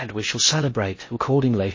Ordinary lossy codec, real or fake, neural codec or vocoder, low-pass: MP3, 32 kbps; fake; codec, 16 kHz in and 24 kHz out, 0.6 kbps, FocalCodec, streaming, 4096 codes; 7.2 kHz